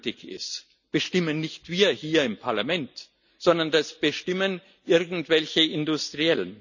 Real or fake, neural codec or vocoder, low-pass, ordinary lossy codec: real; none; 7.2 kHz; none